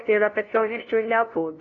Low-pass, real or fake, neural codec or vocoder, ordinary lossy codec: 7.2 kHz; fake; codec, 16 kHz, 0.5 kbps, FunCodec, trained on LibriTTS, 25 frames a second; AAC, 32 kbps